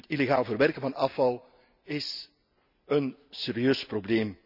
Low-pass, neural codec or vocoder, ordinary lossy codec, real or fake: 5.4 kHz; none; none; real